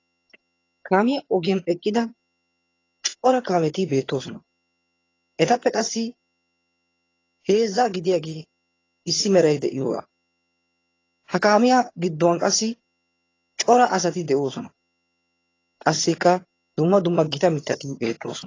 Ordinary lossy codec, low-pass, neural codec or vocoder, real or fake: AAC, 32 kbps; 7.2 kHz; vocoder, 22.05 kHz, 80 mel bands, HiFi-GAN; fake